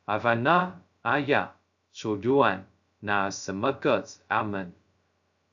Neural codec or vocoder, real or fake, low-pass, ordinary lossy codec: codec, 16 kHz, 0.2 kbps, FocalCodec; fake; 7.2 kHz; MP3, 96 kbps